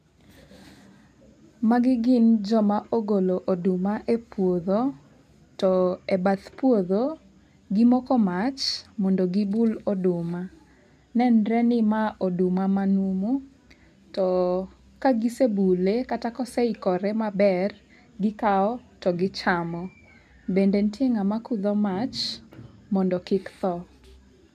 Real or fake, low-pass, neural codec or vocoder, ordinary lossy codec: real; 14.4 kHz; none; none